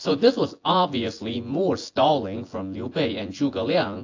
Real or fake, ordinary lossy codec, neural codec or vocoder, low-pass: fake; AAC, 48 kbps; vocoder, 24 kHz, 100 mel bands, Vocos; 7.2 kHz